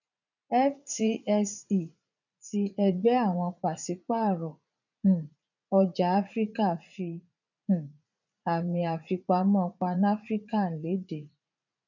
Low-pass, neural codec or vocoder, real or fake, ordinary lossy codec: 7.2 kHz; vocoder, 44.1 kHz, 80 mel bands, Vocos; fake; none